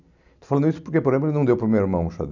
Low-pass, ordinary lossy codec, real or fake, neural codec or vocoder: 7.2 kHz; none; real; none